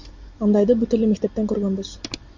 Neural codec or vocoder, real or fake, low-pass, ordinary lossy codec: none; real; 7.2 kHz; Opus, 64 kbps